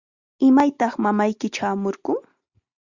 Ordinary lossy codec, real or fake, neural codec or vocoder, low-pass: Opus, 64 kbps; real; none; 7.2 kHz